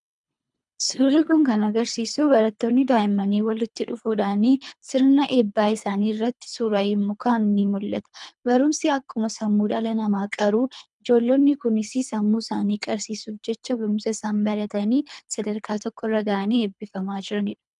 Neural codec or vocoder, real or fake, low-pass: codec, 24 kHz, 3 kbps, HILCodec; fake; 10.8 kHz